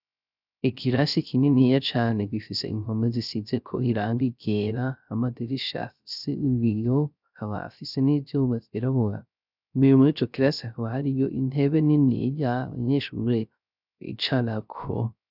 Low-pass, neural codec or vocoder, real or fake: 5.4 kHz; codec, 16 kHz, 0.3 kbps, FocalCodec; fake